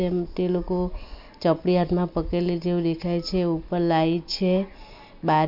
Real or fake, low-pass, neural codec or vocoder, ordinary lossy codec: real; 5.4 kHz; none; MP3, 48 kbps